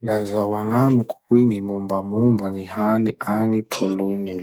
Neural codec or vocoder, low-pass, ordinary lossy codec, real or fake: codec, 44.1 kHz, 2.6 kbps, SNAC; none; none; fake